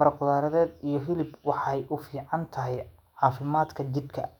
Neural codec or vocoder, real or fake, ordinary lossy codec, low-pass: autoencoder, 48 kHz, 128 numbers a frame, DAC-VAE, trained on Japanese speech; fake; none; 19.8 kHz